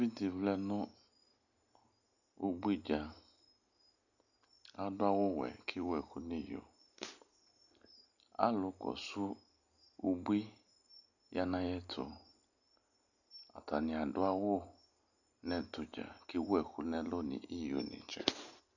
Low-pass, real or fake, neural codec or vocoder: 7.2 kHz; real; none